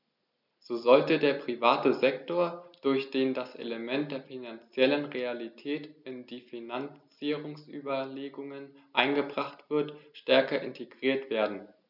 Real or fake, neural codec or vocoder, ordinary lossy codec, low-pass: real; none; none; 5.4 kHz